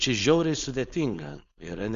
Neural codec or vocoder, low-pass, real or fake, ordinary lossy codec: codec, 16 kHz, 4.8 kbps, FACodec; 7.2 kHz; fake; Opus, 64 kbps